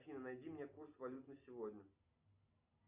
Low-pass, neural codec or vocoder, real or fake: 3.6 kHz; none; real